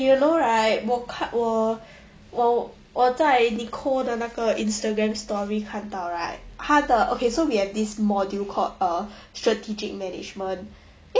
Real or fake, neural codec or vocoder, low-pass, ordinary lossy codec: real; none; none; none